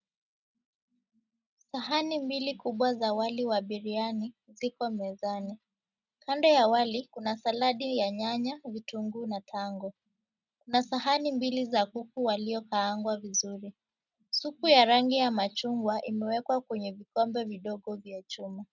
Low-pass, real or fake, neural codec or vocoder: 7.2 kHz; real; none